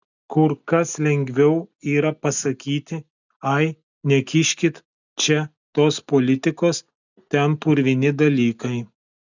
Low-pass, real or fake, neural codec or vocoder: 7.2 kHz; real; none